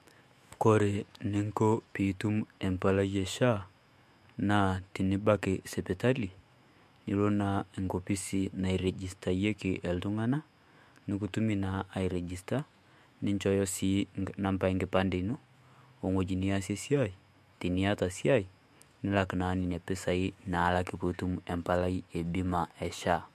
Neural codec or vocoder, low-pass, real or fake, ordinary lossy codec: autoencoder, 48 kHz, 128 numbers a frame, DAC-VAE, trained on Japanese speech; 14.4 kHz; fake; MP3, 64 kbps